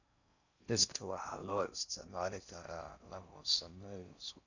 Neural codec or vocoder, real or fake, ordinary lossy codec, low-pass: codec, 16 kHz in and 24 kHz out, 0.6 kbps, FocalCodec, streaming, 4096 codes; fake; none; 7.2 kHz